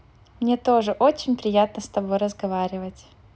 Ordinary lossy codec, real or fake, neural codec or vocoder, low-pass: none; real; none; none